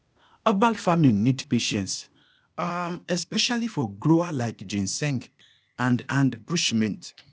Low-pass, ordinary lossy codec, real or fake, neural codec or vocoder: none; none; fake; codec, 16 kHz, 0.8 kbps, ZipCodec